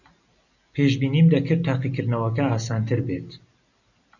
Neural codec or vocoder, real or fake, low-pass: none; real; 7.2 kHz